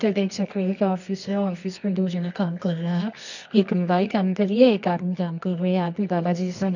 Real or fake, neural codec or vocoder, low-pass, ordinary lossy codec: fake; codec, 24 kHz, 0.9 kbps, WavTokenizer, medium music audio release; 7.2 kHz; none